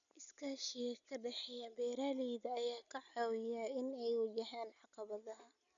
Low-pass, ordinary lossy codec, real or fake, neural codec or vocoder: 7.2 kHz; none; real; none